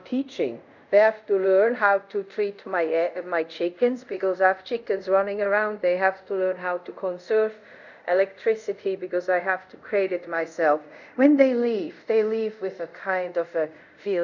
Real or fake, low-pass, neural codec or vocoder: fake; 7.2 kHz; codec, 24 kHz, 0.5 kbps, DualCodec